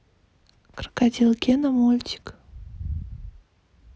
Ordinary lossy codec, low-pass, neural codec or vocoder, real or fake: none; none; none; real